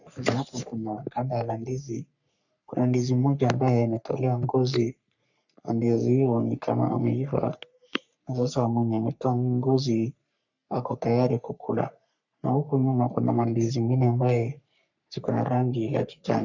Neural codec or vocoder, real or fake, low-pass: codec, 44.1 kHz, 3.4 kbps, Pupu-Codec; fake; 7.2 kHz